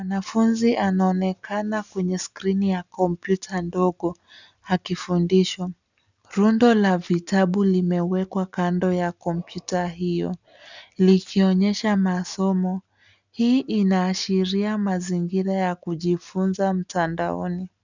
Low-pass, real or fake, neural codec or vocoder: 7.2 kHz; real; none